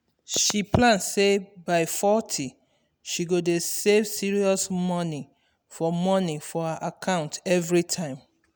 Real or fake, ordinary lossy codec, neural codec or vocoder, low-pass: real; none; none; none